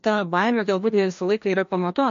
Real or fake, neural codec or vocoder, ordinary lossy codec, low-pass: fake; codec, 16 kHz, 1 kbps, FreqCodec, larger model; MP3, 48 kbps; 7.2 kHz